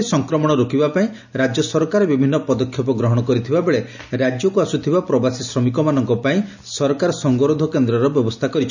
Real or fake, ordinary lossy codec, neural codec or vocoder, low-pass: real; none; none; 7.2 kHz